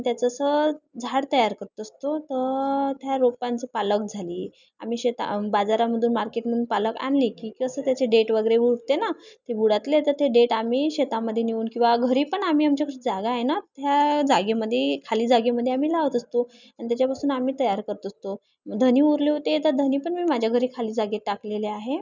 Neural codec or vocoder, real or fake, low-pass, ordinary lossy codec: none; real; 7.2 kHz; none